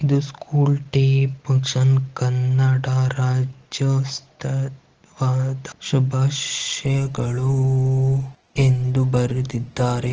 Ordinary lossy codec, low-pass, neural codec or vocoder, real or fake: Opus, 16 kbps; 7.2 kHz; none; real